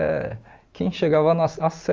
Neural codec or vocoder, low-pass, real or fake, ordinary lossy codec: none; 7.2 kHz; real; Opus, 32 kbps